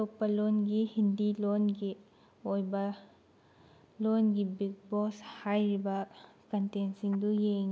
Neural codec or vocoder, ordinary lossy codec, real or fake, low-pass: none; none; real; none